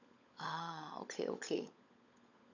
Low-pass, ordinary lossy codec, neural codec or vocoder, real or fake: 7.2 kHz; none; codec, 16 kHz, 16 kbps, FunCodec, trained on LibriTTS, 50 frames a second; fake